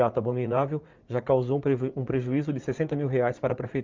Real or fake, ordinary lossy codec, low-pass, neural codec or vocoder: fake; Opus, 32 kbps; 7.2 kHz; vocoder, 44.1 kHz, 80 mel bands, Vocos